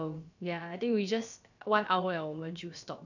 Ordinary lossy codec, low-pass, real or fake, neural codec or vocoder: none; 7.2 kHz; fake; codec, 16 kHz, about 1 kbps, DyCAST, with the encoder's durations